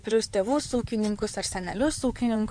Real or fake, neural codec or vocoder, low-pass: fake; codec, 16 kHz in and 24 kHz out, 2.2 kbps, FireRedTTS-2 codec; 9.9 kHz